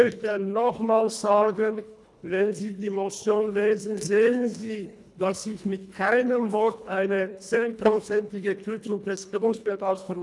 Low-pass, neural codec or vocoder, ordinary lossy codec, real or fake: none; codec, 24 kHz, 1.5 kbps, HILCodec; none; fake